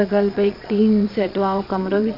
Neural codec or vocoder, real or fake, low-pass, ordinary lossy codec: vocoder, 22.05 kHz, 80 mel bands, Vocos; fake; 5.4 kHz; none